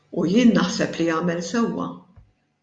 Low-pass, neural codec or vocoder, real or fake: 9.9 kHz; none; real